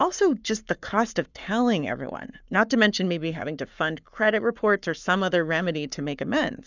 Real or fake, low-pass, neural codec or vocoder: fake; 7.2 kHz; codec, 44.1 kHz, 7.8 kbps, Pupu-Codec